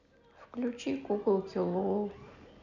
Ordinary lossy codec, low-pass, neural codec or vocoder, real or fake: none; 7.2 kHz; none; real